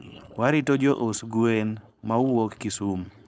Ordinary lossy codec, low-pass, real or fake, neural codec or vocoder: none; none; fake; codec, 16 kHz, 4.8 kbps, FACodec